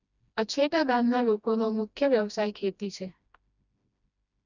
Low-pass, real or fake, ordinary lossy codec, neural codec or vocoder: 7.2 kHz; fake; none; codec, 16 kHz, 1 kbps, FreqCodec, smaller model